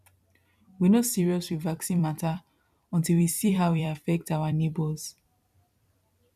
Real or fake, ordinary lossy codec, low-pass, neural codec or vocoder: fake; none; 14.4 kHz; vocoder, 44.1 kHz, 128 mel bands every 256 samples, BigVGAN v2